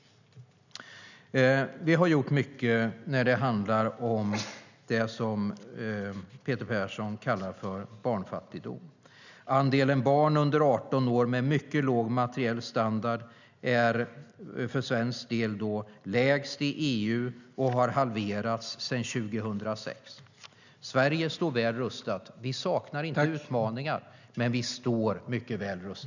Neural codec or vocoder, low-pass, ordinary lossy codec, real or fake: none; 7.2 kHz; none; real